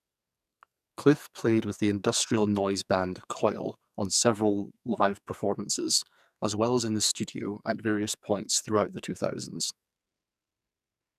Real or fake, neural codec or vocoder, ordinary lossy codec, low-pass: fake; codec, 44.1 kHz, 2.6 kbps, SNAC; none; 14.4 kHz